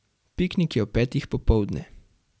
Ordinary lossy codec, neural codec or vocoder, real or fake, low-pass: none; none; real; none